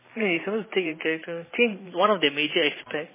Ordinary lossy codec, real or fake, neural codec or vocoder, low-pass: MP3, 16 kbps; fake; vocoder, 44.1 kHz, 128 mel bands every 512 samples, BigVGAN v2; 3.6 kHz